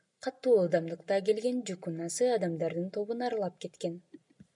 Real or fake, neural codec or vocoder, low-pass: real; none; 10.8 kHz